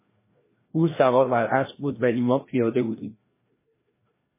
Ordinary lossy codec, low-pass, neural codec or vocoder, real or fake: MP3, 16 kbps; 3.6 kHz; codec, 16 kHz, 1 kbps, FreqCodec, larger model; fake